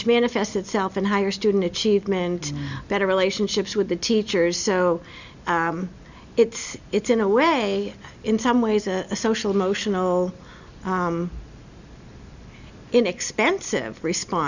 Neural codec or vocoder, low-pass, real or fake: none; 7.2 kHz; real